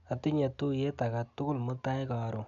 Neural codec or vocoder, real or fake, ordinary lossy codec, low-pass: none; real; none; 7.2 kHz